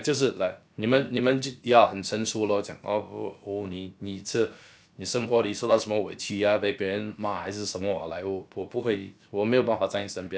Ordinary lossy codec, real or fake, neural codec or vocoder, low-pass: none; fake; codec, 16 kHz, about 1 kbps, DyCAST, with the encoder's durations; none